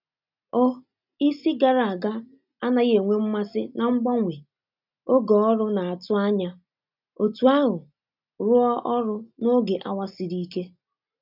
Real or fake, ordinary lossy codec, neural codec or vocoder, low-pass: real; none; none; 5.4 kHz